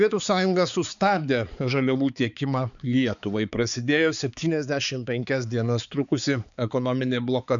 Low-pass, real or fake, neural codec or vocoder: 7.2 kHz; fake; codec, 16 kHz, 4 kbps, X-Codec, HuBERT features, trained on balanced general audio